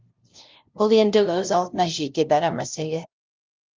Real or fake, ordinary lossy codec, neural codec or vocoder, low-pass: fake; Opus, 24 kbps; codec, 16 kHz, 0.5 kbps, FunCodec, trained on LibriTTS, 25 frames a second; 7.2 kHz